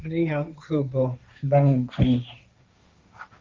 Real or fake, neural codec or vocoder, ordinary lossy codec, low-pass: fake; codec, 16 kHz, 1.1 kbps, Voila-Tokenizer; Opus, 24 kbps; 7.2 kHz